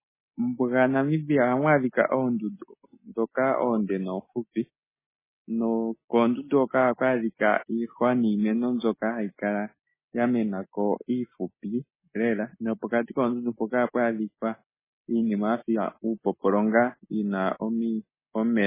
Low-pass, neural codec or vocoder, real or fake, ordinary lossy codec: 3.6 kHz; none; real; MP3, 16 kbps